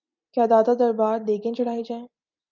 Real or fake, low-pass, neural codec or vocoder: real; 7.2 kHz; none